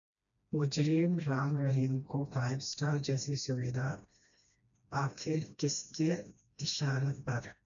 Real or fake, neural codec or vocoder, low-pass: fake; codec, 16 kHz, 1 kbps, FreqCodec, smaller model; 7.2 kHz